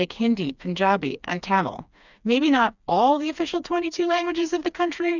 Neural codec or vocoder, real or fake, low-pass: codec, 16 kHz, 2 kbps, FreqCodec, smaller model; fake; 7.2 kHz